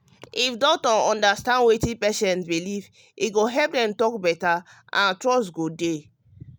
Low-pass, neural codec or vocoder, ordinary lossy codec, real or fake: none; none; none; real